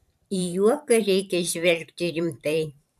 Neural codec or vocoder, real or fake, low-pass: vocoder, 44.1 kHz, 128 mel bands every 512 samples, BigVGAN v2; fake; 14.4 kHz